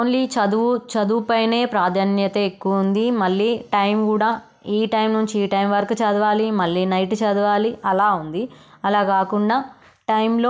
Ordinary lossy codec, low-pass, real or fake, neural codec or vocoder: none; none; real; none